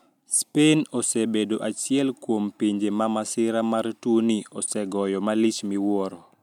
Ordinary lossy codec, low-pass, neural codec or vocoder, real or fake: none; 19.8 kHz; none; real